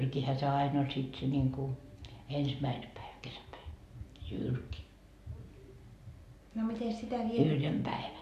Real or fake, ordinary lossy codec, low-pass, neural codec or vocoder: real; AAC, 64 kbps; 14.4 kHz; none